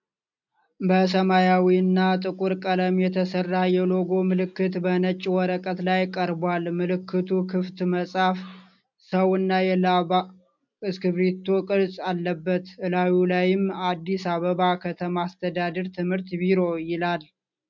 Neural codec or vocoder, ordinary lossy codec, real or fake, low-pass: none; MP3, 64 kbps; real; 7.2 kHz